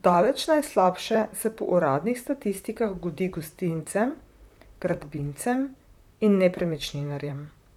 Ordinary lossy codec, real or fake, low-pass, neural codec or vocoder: none; fake; 19.8 kHz; vocoder, 44.1 kHz, 128 mel bands, Pupu-Vocoder